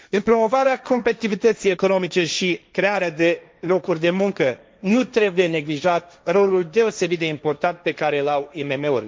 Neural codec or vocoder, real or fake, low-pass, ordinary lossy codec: codec, 16 kHz, 1.1 kbps, Voila-Tokenizer; fake; none; none